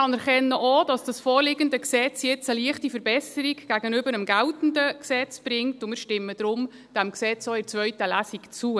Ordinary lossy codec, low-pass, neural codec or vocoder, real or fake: none; none; none; real